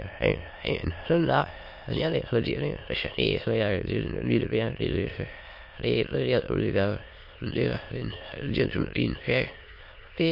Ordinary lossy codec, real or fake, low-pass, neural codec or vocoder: MP3, 32 kbps; fake; 5.4 kHz; autoencoder, 22.05 kHz, a latent of 192 numbers a frame, VITS, trained on many speakers